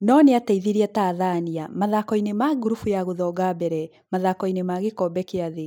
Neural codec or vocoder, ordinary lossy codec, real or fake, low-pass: none; none; real; 19.8 kHz